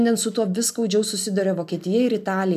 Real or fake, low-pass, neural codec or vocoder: real; 14.4 kHz; none